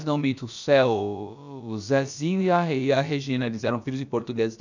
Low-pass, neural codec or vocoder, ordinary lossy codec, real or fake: 7.2 kHz; codec, 16 kHz, about 1 kbps, DyCAST, with the encoder's durations; none; fake